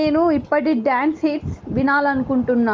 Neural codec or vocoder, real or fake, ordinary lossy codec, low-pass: none; real; Opus, 24 kbps; 7.2 kHz